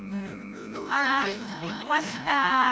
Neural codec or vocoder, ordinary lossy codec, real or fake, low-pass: codec, 16 kHz, 0.5 kbps, FreqCodec, larger model; none; fake; none